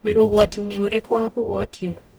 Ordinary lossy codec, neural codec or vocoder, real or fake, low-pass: none; codec, 44.1 kHz, 0.9 kbps, DAC; fake; none